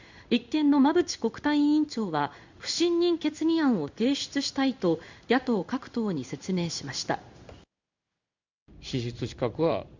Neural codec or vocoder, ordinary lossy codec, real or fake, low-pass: codec, 16 kHz in and 24 kHz out, 1 kbps, XY-Tokenizer; Opus, 64 kbps; fake; 7.2 kHz